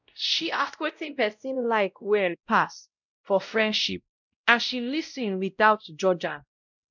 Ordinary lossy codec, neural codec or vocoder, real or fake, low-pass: none; codec, 16 kHz, 0.5 kbps, X-Codec, WavLM features, trained on Multilingual LibriSpeech; fake; 7.2 kHz